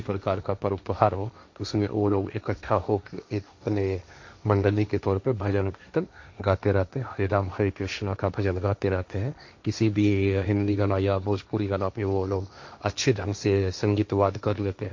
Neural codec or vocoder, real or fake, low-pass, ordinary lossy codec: codec, 16 kHz, 1.1 kbps, Voila-Tokenizer; fake; 7.2 kHz; MP3, 48 kbps